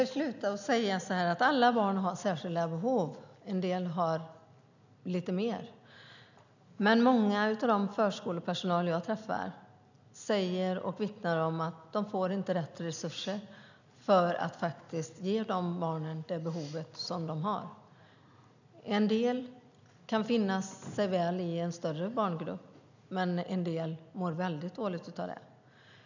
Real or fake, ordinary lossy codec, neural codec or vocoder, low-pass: real; none; none; 7.2 kHz